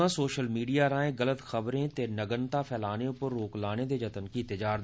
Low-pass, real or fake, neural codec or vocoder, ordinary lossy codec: none; real; none; none